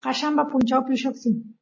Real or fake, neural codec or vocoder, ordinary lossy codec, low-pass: real; none; MP3, 32 kbps; 7.2 kHz